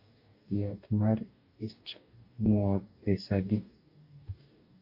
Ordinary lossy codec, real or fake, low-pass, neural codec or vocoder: AAC, 48 kbps; fake; 5.4 kHz; codec, 44.1 kHz, 2.6 kbps, DAC